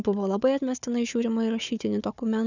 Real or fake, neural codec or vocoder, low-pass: fake; codec, 16 kHz, 4 kbps, FunCodec, trained on Chinese and English, 50 frames a second; 7.2 kHz